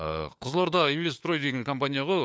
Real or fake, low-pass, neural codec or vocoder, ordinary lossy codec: fake; none; codec, 16 kHz, 4 kbps, FunCodec, trained on LibriTTS, 50 frames a second; none